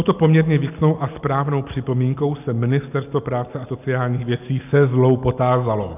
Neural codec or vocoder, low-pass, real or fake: none; 3.6 kHz; real